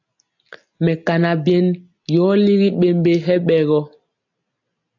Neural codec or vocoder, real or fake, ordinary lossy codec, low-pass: none; real; AAC, 48 kbps; 7.2 kHz